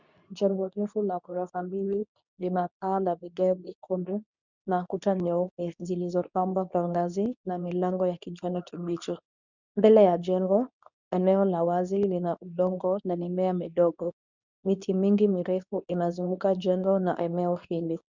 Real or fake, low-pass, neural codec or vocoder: fake; 7.2 kHz; codec, 24 kHz, 0.9 kbps, WavTokenizer, medium speech release version 2